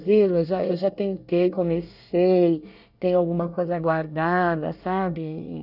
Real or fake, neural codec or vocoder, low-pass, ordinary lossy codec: fake; codec, 24 kHz, 1 kbps, SNAC; 5.4 kHz; none